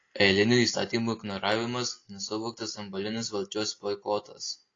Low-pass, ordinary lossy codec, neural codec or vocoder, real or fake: 7.2 kHz; AAC, 32 kbps; none; real